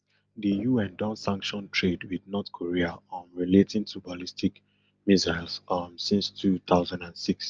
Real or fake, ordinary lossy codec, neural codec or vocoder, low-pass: real; Opus, 24 kbps; none; 7.2 kHz